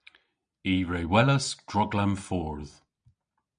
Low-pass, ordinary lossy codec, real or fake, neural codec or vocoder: 9.9 kHz; MP3, 96 kbps; real; none